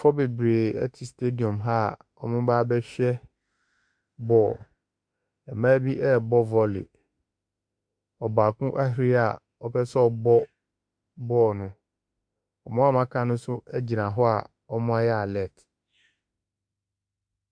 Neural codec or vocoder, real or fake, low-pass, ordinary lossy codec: autoencoder, 48 kHz, 32 numbers a frame, DAC-VAE, trained on Japanese speech; fake; 9.9 kHz; Opus, 64 kbps